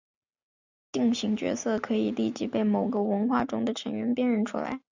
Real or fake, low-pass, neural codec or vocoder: real; 7.2 kHz; none